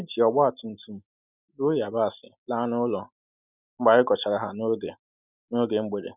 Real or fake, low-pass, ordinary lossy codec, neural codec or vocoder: real; 3.6 kHz; none; none